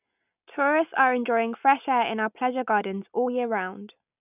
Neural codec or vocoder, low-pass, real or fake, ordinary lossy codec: none; 3.6 kHz; real; none